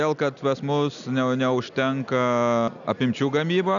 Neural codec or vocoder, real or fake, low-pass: none; real; 7.2 kHz